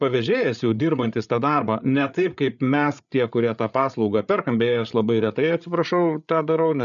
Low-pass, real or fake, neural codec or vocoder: 7.2 kHz; fake; codec, 16 kHz, 8 kbps, FreqCodec, larger model